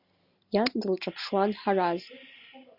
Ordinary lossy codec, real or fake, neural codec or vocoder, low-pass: Opus, 64 kbps; real; none; 5.4 kHz